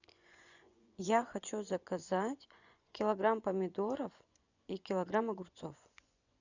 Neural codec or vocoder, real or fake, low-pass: vocoder, 24 kHz, 100 mel bands, Vocos; fake; 7.2 kHz